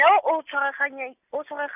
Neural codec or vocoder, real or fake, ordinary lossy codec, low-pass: none; real; none; 3.6 kHz